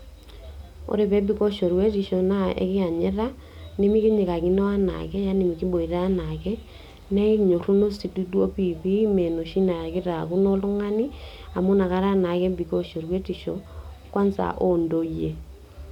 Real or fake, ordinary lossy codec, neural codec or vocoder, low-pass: real; none; none; 19.8 kHz